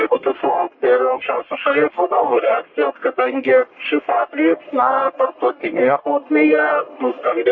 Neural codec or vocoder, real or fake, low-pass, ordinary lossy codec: codec, 44.1 kHz, 1.7 kbps, Pupu-Codec; fake; 7.2 kHz; MP3, 32 kbps